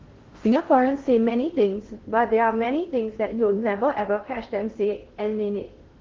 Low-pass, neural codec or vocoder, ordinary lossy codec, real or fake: 7.2 kHz; codec, 16 kHz in and 24 kHz out, 0.6 kbps, FocalCodec, streaming, 4096 codes; Opus, 16 kbps; fake